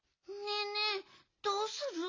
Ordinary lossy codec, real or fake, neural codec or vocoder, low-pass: AAC, 32 kbps; real; none; 7.2 kHz